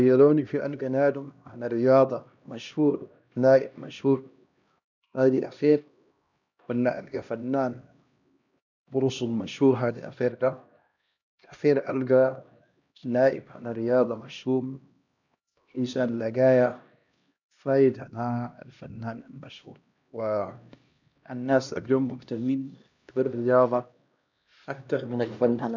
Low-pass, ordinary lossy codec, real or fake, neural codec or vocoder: 7.2 kHz; none; fake; codec, 16 kHz, 1 kbps, X-Codec, HuBERT features, trained on LibriSpeech